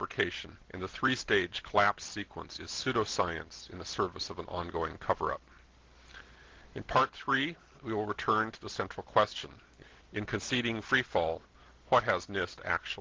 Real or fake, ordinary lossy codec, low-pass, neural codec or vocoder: real; Opus, 16 kbps; 7.2 kHz; none